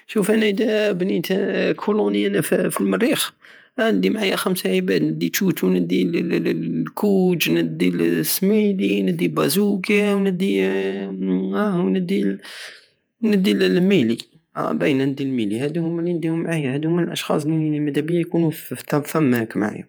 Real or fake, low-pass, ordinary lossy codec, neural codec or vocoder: fake; none; none; vocoder, 48 kHz, 128 mel bands, Vocos